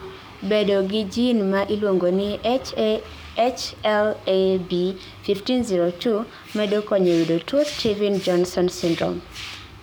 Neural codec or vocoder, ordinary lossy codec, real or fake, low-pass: codec, 44.1 kHz, 7.8 kbps, DAC; none; fake; none